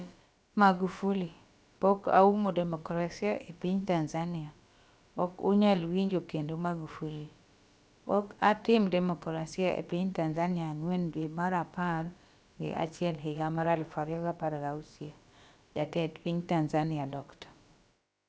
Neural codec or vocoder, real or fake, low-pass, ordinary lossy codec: codec, 16 kHz, about 1 kbps, DyCAST, with the encoder's durations; fake; none; none